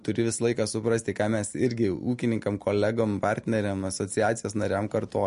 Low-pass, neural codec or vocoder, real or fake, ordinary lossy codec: 10.8 kHz; none; real; MP3, 48 kbps